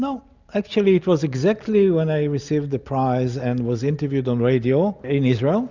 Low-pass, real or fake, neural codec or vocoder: 7.2 kHz; real; none